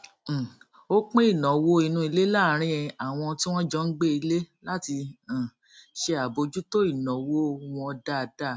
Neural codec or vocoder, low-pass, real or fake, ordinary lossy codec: none; none; real; none